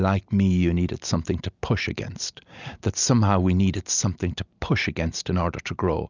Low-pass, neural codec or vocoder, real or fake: 7.2 kHz; none; real